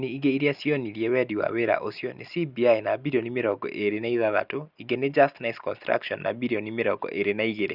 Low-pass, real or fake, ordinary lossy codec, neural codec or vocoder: 5.4 kHz; real; Opus, 64 kbps; none